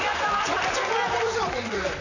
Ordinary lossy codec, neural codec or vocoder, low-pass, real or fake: none; vocoder, 44.1 kHz, 128 mel bands, Pupu-Vocoder; 7.2 kHz; fake